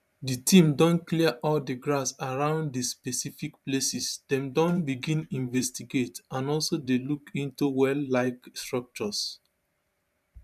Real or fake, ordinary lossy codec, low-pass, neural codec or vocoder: fake; none; 14.4 kHz; vocoder, 44.1 kHz, 128 mel bands every 512 samples, BigVGAN v2